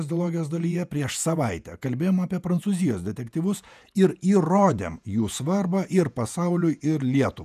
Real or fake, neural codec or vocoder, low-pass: fake; vocoder, 48 kHz, 128 mel bands, Vocos; 14.4 kHz